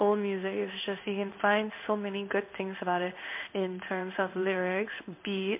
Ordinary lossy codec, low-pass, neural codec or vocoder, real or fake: MP3, 32 kbps; 3.6 kHz; codec, 16 kHz in and 24 kHz out, 1 kbps, XY-Tokenizer; fake